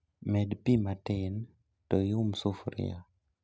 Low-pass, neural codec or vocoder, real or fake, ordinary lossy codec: none; none; real; none